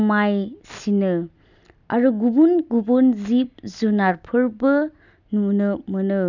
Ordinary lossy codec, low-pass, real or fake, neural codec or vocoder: none; 7.2 kHz; real; none